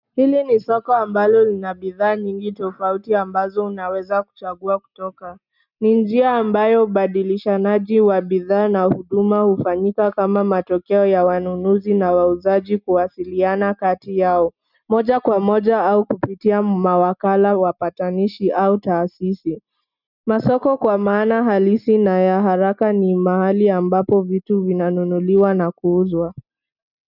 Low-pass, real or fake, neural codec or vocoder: 5.4 kHz; real; none